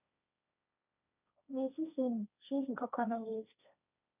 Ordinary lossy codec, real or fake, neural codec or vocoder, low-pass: none; fake; codec, 16 kHz, 1.1 kbps, Voila-Tokenizer; 3.6 kHz